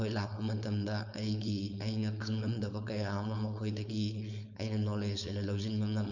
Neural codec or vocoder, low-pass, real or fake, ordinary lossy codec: codec, 16 kHz, 4.8 kbps, FACodec; 7.2 kHz; fake; none